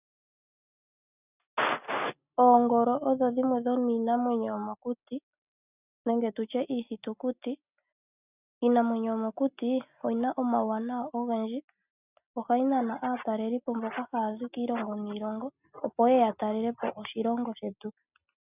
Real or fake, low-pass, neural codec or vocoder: real; 3.6 kHz; none